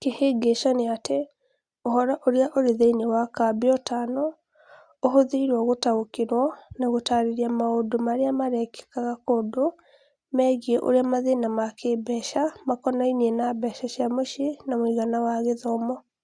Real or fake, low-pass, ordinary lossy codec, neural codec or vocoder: real; 9.9 kHz; Opus, 64 kbps; none